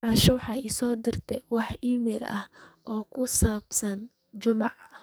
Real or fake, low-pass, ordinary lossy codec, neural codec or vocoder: fake; none; none; codec, 44.1 kHz, 2.6 kbps, SNAC